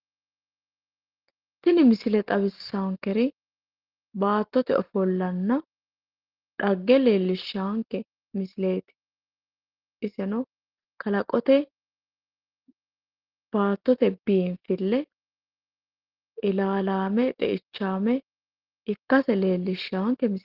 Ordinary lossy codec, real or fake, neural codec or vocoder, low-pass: Opus, 16 kbps; real; none; 5.4 kHz